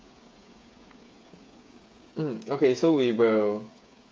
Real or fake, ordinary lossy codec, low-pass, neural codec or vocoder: fake; none; none; codec, 16 kHz, 8 kbps, FreqCodec, smaller model